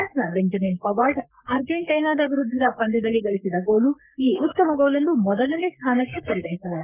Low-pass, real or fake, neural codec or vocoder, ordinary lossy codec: 3.6 kHz; fake; codec, 44.1 kHz, 3.4 kbps, Pupu-Codec; none